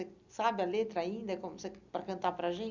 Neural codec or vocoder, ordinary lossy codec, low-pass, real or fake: none; Opus, 64 kbps; 7.2 kHz; real